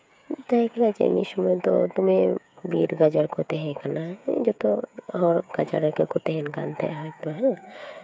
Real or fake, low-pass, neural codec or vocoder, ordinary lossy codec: fake; none; codec, 16 kHz, 8 kbps, FreqCodec, smaller model; none